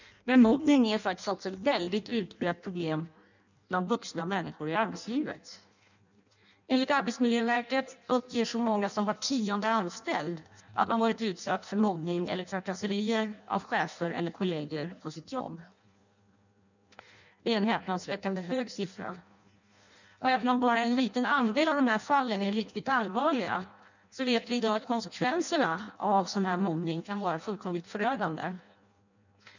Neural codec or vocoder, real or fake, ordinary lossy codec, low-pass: codec, 16 kHz in and 24 kHz out, 0.6 kbps, FireRedTTS-2 codec; fake; none; 7.2 kHz